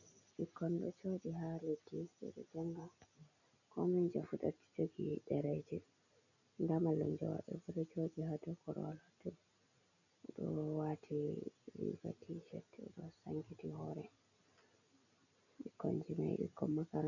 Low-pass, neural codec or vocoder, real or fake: 7.2 kHz; none; real